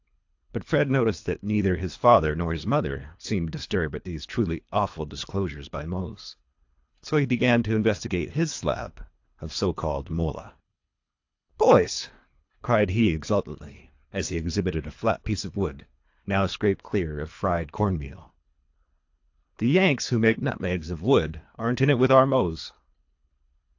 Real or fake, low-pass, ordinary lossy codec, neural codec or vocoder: fake; 7.2 kHz; AAC, 48 kbps; codec, 24 kHz, 3 kbps, HILCodec